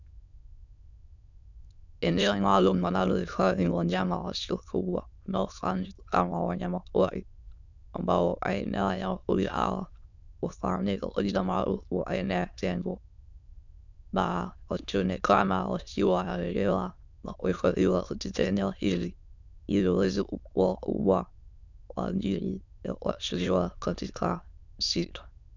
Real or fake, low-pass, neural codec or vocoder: fake; 7.2 kHz; autoencoder, 22.05 kHz, a latent of 192 numbers a frame, VITS, trained on many speakers